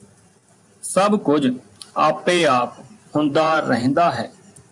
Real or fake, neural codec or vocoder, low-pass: fake; vocoder, 44.1 kHz, 128 mel bands every 512 samples, BigVGAN v2; 10.8 kHz